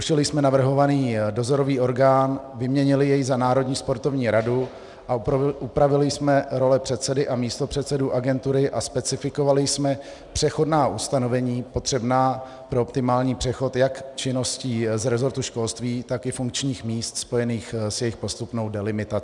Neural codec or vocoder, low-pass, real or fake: none; 10.8 kHz; real